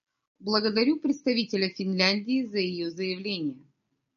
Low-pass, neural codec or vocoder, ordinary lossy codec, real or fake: 7.2 kHz; none; MP3, 96 kbps; real